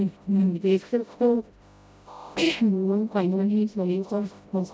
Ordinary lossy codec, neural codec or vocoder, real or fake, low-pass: none; codec, 16 kHz, 0.5 kbps, FreqCodec, smaller model; fake; none